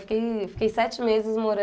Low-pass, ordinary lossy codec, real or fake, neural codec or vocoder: none; none; real; none